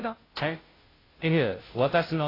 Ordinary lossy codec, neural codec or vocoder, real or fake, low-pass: AAC, 24 kbps; codec, 16 kHz, 0.5 kbps, FunCodec, trained on Chinese and English, 25 frames a second; fake; 5.4 kHz